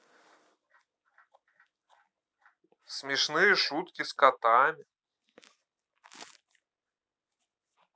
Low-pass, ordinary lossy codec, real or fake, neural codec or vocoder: none; none; real; none